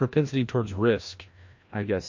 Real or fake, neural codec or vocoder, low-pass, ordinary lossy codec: fake; codec, 16 kHz, 1 kbps, FreqCodec, larger model; 7.2 kHz; MP3, 48 kbps